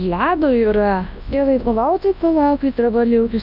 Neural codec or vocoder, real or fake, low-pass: codec, 24 kHz, 0.9 kbps, WavTokenizer, large speech release; fake; 5.4 kHz